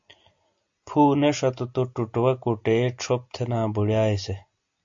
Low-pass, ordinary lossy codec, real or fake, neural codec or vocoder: 7.2 kHz; AAC, 64 kbps; real; none